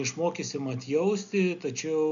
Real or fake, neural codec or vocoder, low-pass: real; none; 7.2 kHz